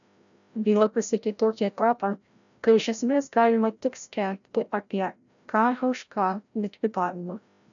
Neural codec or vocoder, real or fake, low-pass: codec, 16 kHz, 0.5 kbps, FreqCodec, larger model; fake; 7.2 kHz